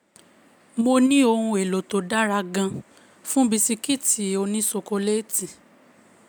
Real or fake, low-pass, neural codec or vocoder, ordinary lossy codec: real; none; none; none